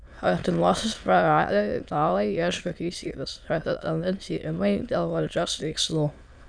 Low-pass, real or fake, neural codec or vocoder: 9.9 kHz; fake; autoencoder, 22.05 kHz, a latent of 192 numbers a frame, VITS, trained on many speakers